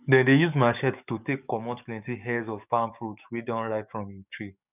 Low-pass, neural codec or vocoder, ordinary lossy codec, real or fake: 3.6 kHz; codec, 44.1 kHz, 7.8 kbps, DAC; none; fake